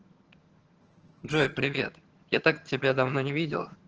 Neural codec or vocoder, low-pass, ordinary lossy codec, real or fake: vocoder, 22.05 kHz, 80 mel bands, HiFi-GAN; 7.2 kHz; Opus, 16 kbps; fake